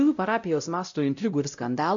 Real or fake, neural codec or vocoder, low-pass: fake; codec, 16 kHz, 0.5 kbps, X-Codec, WavLM features, trained on Multilingual LibriSpeech; 7.2 kHz